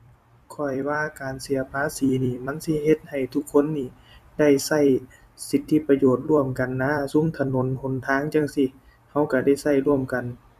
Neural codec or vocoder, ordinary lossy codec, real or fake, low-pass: vocoder, 44.1 kHz, 128 mel bands every 256 samples, BigVGAN v2; none; fake; 14.4 kHz